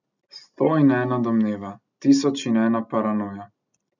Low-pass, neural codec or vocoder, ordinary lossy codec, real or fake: 7.2 kHz; none; none; real